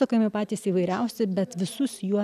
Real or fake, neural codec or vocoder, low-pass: real; none; 14.4 kHz